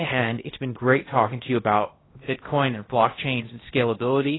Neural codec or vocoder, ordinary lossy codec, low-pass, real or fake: codec, 16 kHz in and 24 kHz out, 0.6 kbps, FocalCodec, streaming, 2048 codes; AAC, 16 kbps; 7.2 kHz; fake